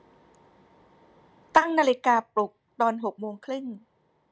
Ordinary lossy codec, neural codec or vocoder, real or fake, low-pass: none; none; real; none